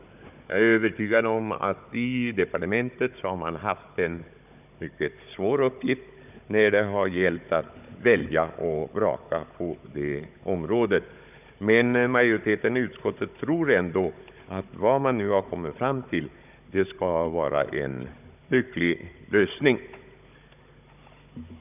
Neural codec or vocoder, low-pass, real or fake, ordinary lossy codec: codec, 16 kHz, 16 kbps, FunCodec, trained on Chinese and English, 50 frames a second; 3.6 kHz; fake; none